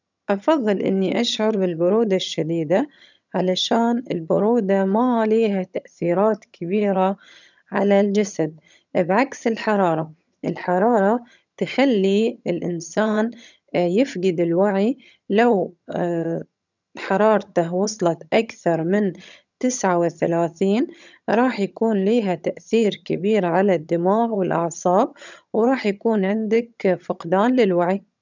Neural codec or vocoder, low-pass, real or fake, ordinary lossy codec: vocoder, 22.05 kHz, 80 mel bands, HiFi-GAN; 7.2 kHz; fake; none